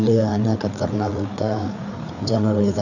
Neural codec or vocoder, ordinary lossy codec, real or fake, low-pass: codec, 16 kHz, 4 kbps, FreqCodec, larger model; none; fake; 7.2 kHz